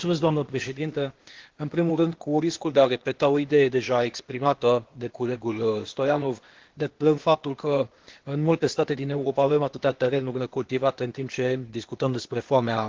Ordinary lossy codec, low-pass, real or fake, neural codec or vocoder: Opus, 16 kbps; 7.2 kHz; fake; codec, 16 kHz, 0.8 kbps, ZipCodec